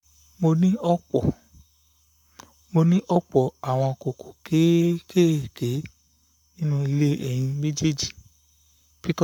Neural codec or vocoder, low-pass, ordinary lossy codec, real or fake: codec, 44.1 kHz, 7.8 kbps, Pupu-Codec; 19.8 kHz; none; fake